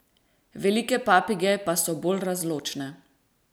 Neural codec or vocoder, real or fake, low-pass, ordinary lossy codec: none; real; none; none